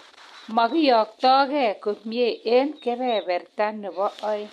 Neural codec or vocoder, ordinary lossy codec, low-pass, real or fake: none; MP3, 64 kbps; 14.4 kHz; real